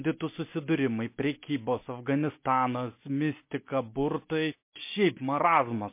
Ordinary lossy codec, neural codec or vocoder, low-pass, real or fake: MP3, 24 kbps; none; 3.6 kHz; real